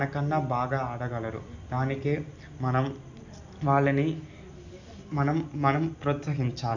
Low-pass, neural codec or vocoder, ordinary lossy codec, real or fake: 7.2 kHz; none; none; real